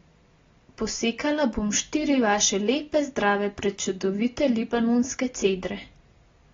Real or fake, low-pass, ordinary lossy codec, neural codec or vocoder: real; 7.2 kHz; AAC, 24 kbps; none